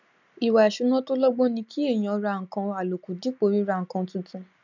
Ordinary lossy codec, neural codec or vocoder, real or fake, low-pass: none; none; real; 7.2 kHz